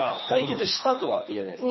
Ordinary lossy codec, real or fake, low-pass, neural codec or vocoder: MP3, 24 kbps; fake; 7.2 kHz; codec, 24 kHz, 3 kbps, HILCodec